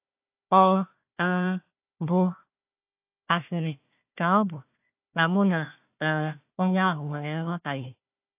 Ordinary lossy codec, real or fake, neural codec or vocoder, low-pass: AAC, 32 kbps; fake; codec, 16 kHz, 1 kbps, FunCodec, trained on Chinese and English, 50 frames a second; 3.6 kHz